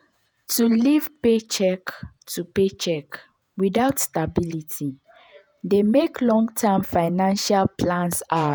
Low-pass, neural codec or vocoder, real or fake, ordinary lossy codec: none; vocoder, 48 kHz, 128 mel bands, Vocos; fake; none